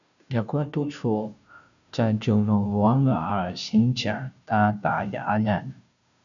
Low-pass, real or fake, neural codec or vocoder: 7.2 kHz; fake; codec, 16 kHz, 0.5 kbps, FunCodec, trained on Chinese and English, 25 frames a second